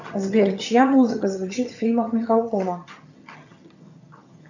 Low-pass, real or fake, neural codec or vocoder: 7.2 kHz; fake; vocoder, 22.05 kHz, 80 mel bands, HiFi-GAN